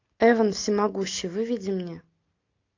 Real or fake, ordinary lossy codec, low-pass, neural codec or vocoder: real; AAC, 48 kbps; 7.2 kHz; none